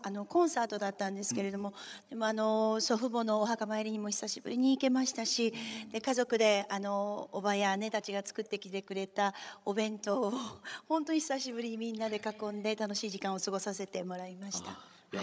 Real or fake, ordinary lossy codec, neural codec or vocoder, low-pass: fake; none; codec, 16 kHz, 16 kbps, FreqCodec, larger model; none